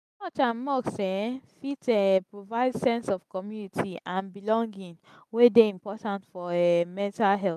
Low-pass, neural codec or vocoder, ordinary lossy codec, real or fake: 14.4 kHz; none; none; real